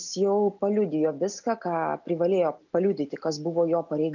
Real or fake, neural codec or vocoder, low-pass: real; none; 7.2 kHz